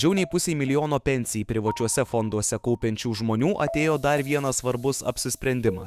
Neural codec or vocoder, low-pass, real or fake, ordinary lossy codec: autoencoder, 48 kHz, 128 numbers a frame, DAC-VAE, trained on Japanese speech; 14.4 kHz; fake; Opus, 64 kbps